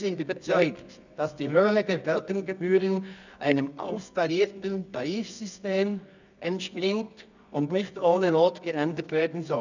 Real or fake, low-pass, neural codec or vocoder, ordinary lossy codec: fake; 7.2 kHz; codec, 24 kHz, 0.9 kbps, WavTokenizer, medium music audio release; none